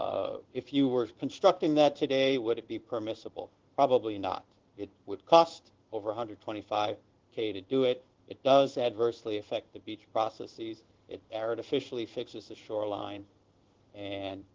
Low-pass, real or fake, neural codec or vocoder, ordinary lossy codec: 7.2 kHz; fake; codec, 16 kHz in and 24 kHz out, 1 kbps, XY-Tokenizer; Opus, 16 kbps